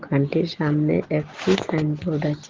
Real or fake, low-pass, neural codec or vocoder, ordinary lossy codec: real; 7.2 kHz; none; Opus, 16 kbps